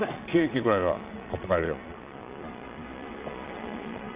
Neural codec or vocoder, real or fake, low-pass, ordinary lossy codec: vocoder, 22.05 kHz, 80 mel bands, Vocos; fake; 3.6 kHz; Opus, 64 kbps